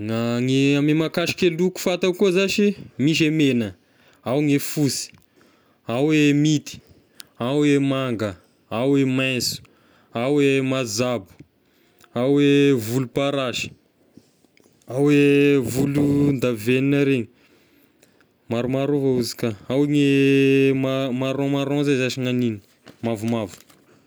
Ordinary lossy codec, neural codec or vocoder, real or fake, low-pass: none; none; real; none